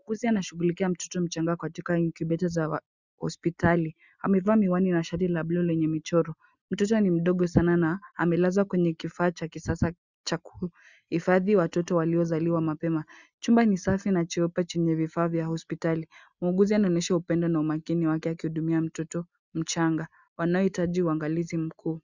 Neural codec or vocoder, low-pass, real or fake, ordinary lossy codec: none; 7.2 kHz; real; Opus, 64 kbps